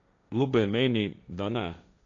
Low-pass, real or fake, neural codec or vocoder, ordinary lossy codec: 7.2 kHz; fake; codec, 16 kHz, 1.1 kbps, Voila-Tokenizer; none